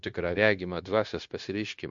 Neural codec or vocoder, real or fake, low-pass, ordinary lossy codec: codec, 16 kHz, 0.9 kbps, LongCat-Audio-Codec; fake; 7.2 kHz; MP3, 48 kbps